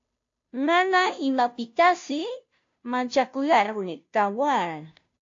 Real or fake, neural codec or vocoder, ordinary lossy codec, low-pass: fake; codec, 16 kHz, 0.5 kbps, FunCodec, trained on Chinese and English, 25 frames a second; AAC, 48 kbps; 7.2 kHz